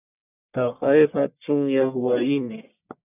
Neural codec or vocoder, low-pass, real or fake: codec, 44.1 kHz, 1.7 kbps, Pupu-Codec; 3.6 kHz; fake